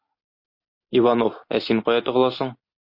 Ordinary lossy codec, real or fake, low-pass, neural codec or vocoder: MP3, 32 kbps; real; 5.4 kHz; none